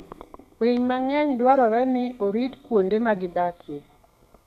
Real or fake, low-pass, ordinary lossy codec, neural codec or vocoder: fake; 14.4 kHz; none; codec, 32 kHz, 1.9 kbps, SNAC